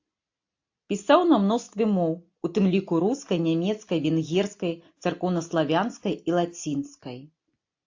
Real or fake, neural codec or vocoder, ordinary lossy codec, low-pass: real; none; AAC, 48 kbps; 7.2 kHz